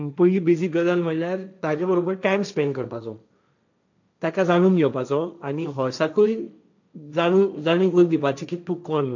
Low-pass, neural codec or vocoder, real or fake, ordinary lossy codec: 7.2 kHz; codec, 16 kHz, 1.1 kbps, Voila-Tokenizer; fake; none